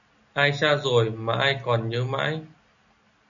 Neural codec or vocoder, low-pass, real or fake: none; 7.2 kHz; real